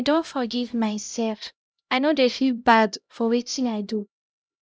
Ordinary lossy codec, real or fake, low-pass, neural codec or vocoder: none; fake; none; codec, 16 kHz, 0.5 kbps, X-Codec, HuBERT features, trained on LibriSpeech